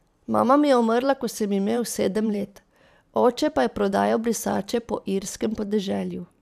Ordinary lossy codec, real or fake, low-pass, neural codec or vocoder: none; fake; 14.4 kHz; vocoder, 44.1 kHz, 128 mel bands every 512 samples, BigVGAN v2